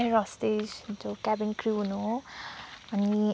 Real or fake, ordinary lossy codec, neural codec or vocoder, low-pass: real; none; none; none